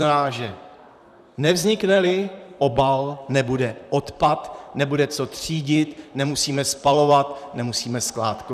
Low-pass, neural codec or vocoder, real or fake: 14.4 kHz; vocoder, 44.1 kHz, 128 mel bands, Pupu-Vocoder; fake